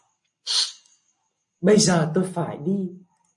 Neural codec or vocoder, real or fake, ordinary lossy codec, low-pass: none; real; MP3, 48 kbps; 10.8 kHz